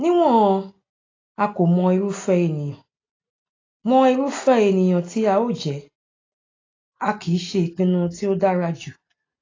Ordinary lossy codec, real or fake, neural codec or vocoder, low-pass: AAC, 32 kbps; real; none; 7.2 kHz